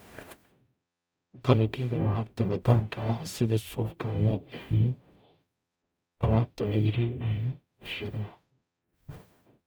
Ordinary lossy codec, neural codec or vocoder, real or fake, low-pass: none; codec, 44.1 kHz, 0.9 kbps, DAC; fake; none